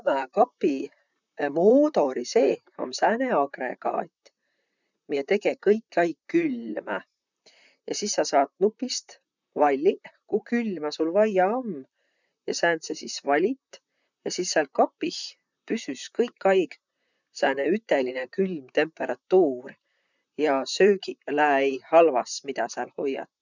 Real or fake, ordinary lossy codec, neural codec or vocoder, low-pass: fake; none; vocoder, 44.1 kHz, 128 mel bands, Pupu-Vocoder; 7.2 kHz